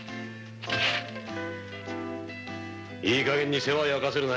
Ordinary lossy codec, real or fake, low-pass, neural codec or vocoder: none; real; none; none